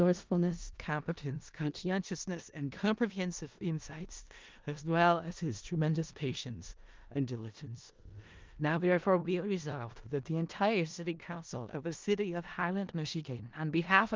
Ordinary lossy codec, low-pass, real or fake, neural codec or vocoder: Opus, 32 kbps; 7.2 kHz; fake; codec, 16 kHz in and 24 kHz out, 0.4 kbps, LongCat-Audio-Codec, four codebook decoder